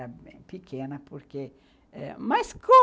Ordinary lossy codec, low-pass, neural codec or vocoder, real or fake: none; none; none; real